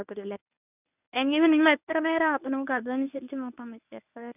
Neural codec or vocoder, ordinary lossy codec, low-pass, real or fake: codec, 24 kHz, 0.9 kbps, WavTokenizer, medium speech release version 1; none; 3.6 kHz; fake